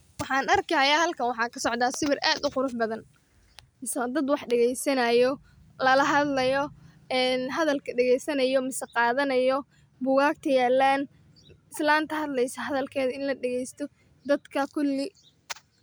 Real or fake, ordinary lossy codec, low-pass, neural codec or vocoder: real; none; none; none